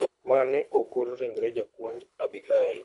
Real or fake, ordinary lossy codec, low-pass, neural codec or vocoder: fake; none; 10.8 kHz; codec, 24 kHz, 3 kbps, HILCodec